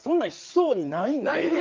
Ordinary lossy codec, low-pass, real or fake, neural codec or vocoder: Opus, 32 kbps; 7.2 kHz; fake; codec, 16 kHz, 4 kbps, FreqCodec, larger model